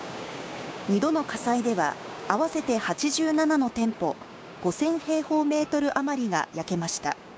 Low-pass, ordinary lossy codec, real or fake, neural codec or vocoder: none; none; fake; codec, 16 kHz, 6 kbps, DAC